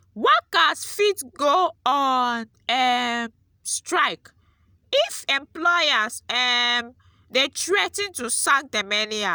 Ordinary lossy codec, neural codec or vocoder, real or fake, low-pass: none; none; real; none